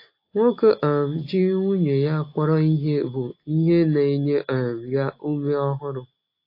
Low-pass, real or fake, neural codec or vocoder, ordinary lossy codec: 5.4 kHz; real; none; AAC, 32 kbps